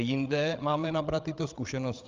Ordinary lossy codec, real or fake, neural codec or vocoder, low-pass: Opus, 24 kbps; fake; codec, 16 kHz, 16 kbps, FreqCodec, larger model; 7.2 kHz